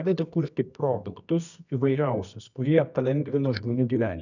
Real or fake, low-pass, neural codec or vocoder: fake; 7.2 kHz; codec, 24 kHz, 0.9 kbps, WavTokenizer, medium music audio release